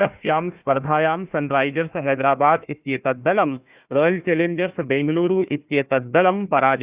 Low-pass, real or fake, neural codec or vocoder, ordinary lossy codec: 3.6 kHz; fake; codec, 16 kHz, 1 kbps, FunCodec, trained on Chinese and English, 50 frames a second; Opus, 64 kbps